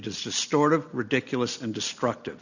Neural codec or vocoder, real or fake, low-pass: none; real; 7.2 kHz